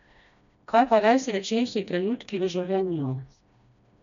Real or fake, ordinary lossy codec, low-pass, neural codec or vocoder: fake; none; 7.2 kHz; codec, 16 kHz, 1 kbps, FreqCodec, smaller model